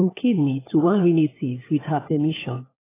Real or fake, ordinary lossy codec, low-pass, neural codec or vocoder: fake; AAC, 16 kbps; 3.6 kHz; codec, 16 kHz, 4 kbps, FunCodec, trained on LibriTTS, 50 frames a second